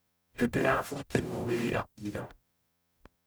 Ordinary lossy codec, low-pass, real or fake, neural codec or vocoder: none; none; fake; codec, 44.1 kHz, 0.9 kbps, DAC